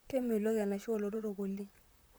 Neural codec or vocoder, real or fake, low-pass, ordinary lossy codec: vocoder, 44.1 kHz, 128 mel bands, Pupu-Vocoder; fake; none; none